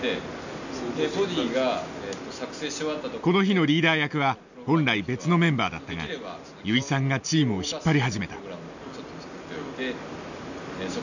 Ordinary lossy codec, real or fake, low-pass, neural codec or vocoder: none; real; 7.2 kHz; none